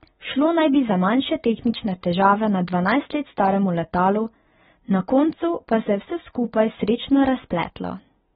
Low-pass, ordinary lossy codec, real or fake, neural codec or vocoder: 19.8 kHz; AAC, 16 kbps; real; none